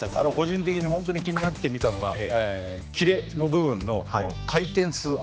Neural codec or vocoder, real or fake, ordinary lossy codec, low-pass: codec, 16 kHz, 2 kbps, X-Codec, HuBERT features, trained on general audio; fake; none; none